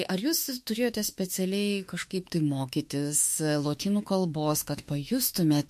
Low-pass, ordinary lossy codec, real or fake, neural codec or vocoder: 14.4 kHz; MP3, 64 kbps; fake; autoencoder, 48 kHz, 32 numbers a frame, DAC-VAE, trained on Japanese speech